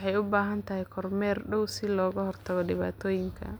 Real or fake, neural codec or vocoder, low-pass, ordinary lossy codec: real; none; none; none